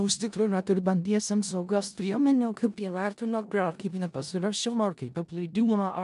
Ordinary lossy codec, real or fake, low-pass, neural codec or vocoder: AAC, 96 kbps; fake; 10.8 kHz; codec, 16 kHz in and 24 kHz out, 0.4 kbps, LongCat-Audio-Codec, four codebook decoder